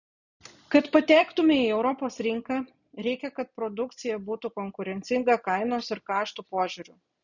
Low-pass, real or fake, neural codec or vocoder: 7.2 kHz; real; none